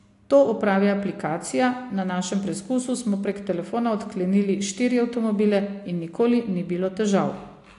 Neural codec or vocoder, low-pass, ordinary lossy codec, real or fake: none; 10.8 kHz; AAC, 48 kbps; real